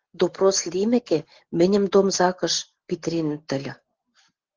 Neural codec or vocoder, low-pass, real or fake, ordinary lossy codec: none; 7.2 kHz; real; Opus, 16 kbps